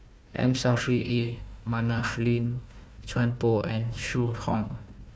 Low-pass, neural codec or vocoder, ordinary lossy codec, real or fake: none; codec, 16 kHz, 1 kbps, FunCodec, trained on Chinese and English, 50 frames a second; none; fake